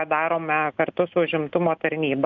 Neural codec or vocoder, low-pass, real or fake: none; 7.2 kHz; real